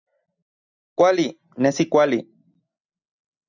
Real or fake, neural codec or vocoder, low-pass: real; none; 7.2 kHz